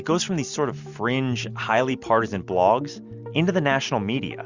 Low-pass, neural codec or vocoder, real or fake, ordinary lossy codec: 7.2 kHz; none; real; Opus, 64 kbps